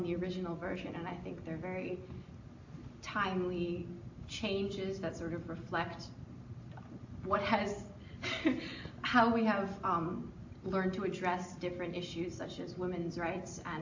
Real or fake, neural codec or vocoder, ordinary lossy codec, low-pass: real; none; AAC, 48 kbps; 7.2 kHz